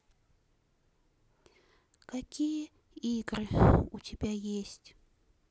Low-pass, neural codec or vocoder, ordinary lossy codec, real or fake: none; none; none; real